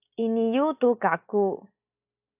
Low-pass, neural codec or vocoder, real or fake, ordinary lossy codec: 3.6 kHz; none; real; AAC, 24 kbps